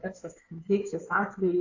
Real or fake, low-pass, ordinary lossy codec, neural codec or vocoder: fake; 7.2 kHz; AAC, 48 kbps; codec, 16 kHz in and 24 kHz out, 2.2 kbps, FireRedTTS-2 codec